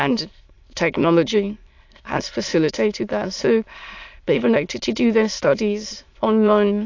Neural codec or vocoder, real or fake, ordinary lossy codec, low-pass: autoencoder, 22.05 kHz, a latent of 192 numbers a frame, VITS, trained on many speakers; fake; AAC, 48 kbps; 7.2 kHz